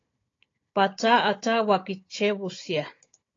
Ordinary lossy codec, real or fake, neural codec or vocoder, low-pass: AAC, 32 kbps; fake; codec, 16 kHz, 16 kbps, FunCodec, trained on Chinese and English, 50 frames a second; 7.2 kHz